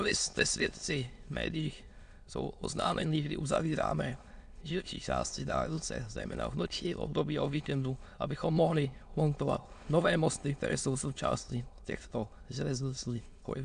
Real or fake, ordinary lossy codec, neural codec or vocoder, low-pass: fake; AAC, 64 kbps; autoencoder, 22.05 kHz, a latent of 192 numbers a frame, VITS, trained on many speakers; 9.9 kHz